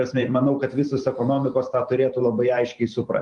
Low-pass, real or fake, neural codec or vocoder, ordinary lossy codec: 10.8 kHz; fake; vocoder, 44.1 kHz, 128 mel bands every 512 samples, BigVGAN v2; Opus, 32 kbps